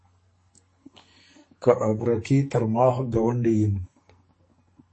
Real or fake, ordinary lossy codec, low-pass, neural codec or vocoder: fake; MP3, 32 kbps; 10.8 kHz; codec, 44.1 kHz, 2.6 kbps, SNAC